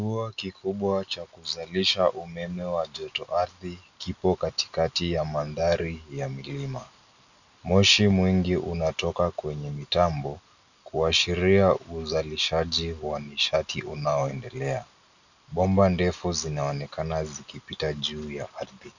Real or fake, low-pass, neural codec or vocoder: real; 7.2 kHz; none